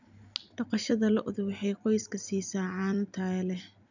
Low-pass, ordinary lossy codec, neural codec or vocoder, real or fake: 7.2 kHz; none; vocoder, 44.1 kHz, 128 mel bands every 256 samples, BigVGAN v2; fake